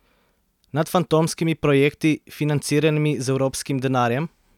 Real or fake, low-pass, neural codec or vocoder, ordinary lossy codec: real; 19.8 kHz; none; none